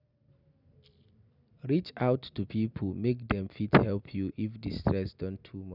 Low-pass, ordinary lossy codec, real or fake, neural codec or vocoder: 5.4 kHz; none; real; none